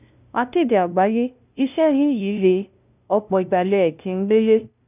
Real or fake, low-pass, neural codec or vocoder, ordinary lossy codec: fake; 3.6 kHz; codec, 16 kHz, 0.5 kbps, FunCodec, trained on LibriTTS, 25 frames a second; none